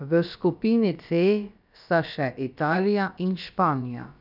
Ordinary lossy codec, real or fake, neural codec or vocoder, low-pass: none; fake; codec, 16 kHz, about 1 kbps, DyCAST, with the encoder's durations; 5.4 kHz